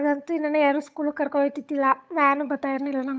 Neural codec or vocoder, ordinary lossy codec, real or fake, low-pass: codec, 16 kHz, 8 kbps, FunCodec, trained on Chinese and English, 25 frames a second; none; fake; none